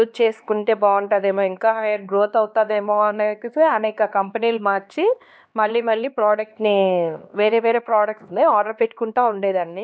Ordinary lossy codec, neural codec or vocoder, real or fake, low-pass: none; codec, 16 kHz, 2 kbps, X-Codec, HuBERT features, trained on LibriSpeech; fake; none